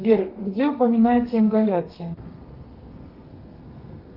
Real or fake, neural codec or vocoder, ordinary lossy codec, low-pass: fake; codec, 32 kHz, 1.9 kbps, SNAC; Opus, 32 kbps; 5.4 kHz